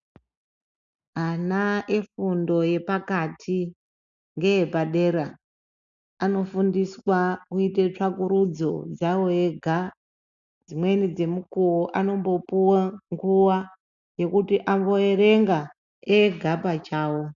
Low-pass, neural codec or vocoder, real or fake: 7.2 kHz; none; real